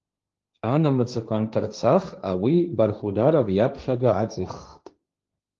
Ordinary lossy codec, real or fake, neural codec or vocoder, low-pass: Opus, 32 kbps; fake; codec, 16 kHz, 1.1 kbps, Voila-Tokenizer; 7.2 kHz